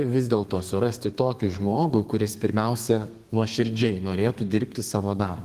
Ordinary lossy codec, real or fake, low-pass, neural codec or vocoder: Opus, 24 kbps; fake; 14.4 kHz; codec, 32 kHz, 1.9 kbps, SNAC